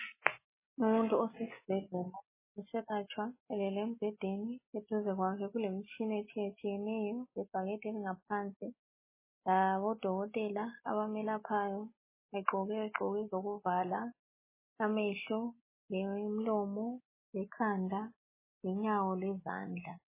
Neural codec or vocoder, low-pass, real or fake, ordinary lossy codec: none; 3.6 kHz; real; MP3, 16 kbps